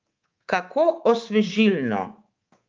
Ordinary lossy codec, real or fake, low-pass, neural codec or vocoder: Opus, 32 kbps; fake; 7.2 kHz; codec, 24 kHz, 3.1 kbps, DualCodec